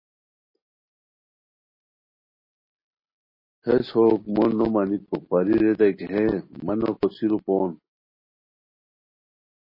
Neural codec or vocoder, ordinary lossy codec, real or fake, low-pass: none; MP3, 24 kbps; real; 5.4 kHz